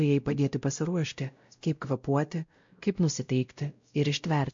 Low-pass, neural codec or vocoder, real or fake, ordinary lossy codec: 7.2 kHz; codec, 16 kHz, 0.5 kbps, X-Codec, WavLM features, trained on Multilingual LibriSpeech; fake; MP3, 48 kbps